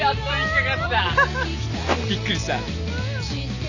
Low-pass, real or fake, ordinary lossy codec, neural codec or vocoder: 7.2 kHz; real; none; none